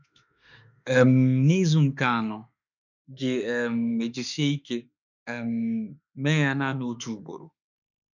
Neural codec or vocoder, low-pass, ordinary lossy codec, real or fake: autoencoder, 48 kHz, 32 numbers a frame, DAC-VAE, trained on Japanese speech; 7.2 kHz; none; fake